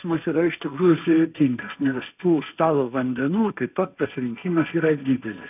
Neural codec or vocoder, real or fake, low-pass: codec, 16 kHz, 1.1 kbps, Voila-Tokenizer; fake; 3.6 kHz